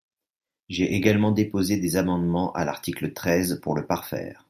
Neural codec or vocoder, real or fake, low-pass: none; real; 14.4 kHz